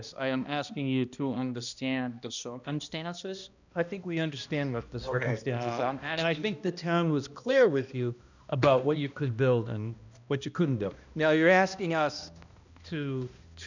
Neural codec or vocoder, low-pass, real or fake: codec, 16 kHz, 1 kbps, X-Codec, HuBERT features, trained on balanced general audio; 7.2 kHz; fake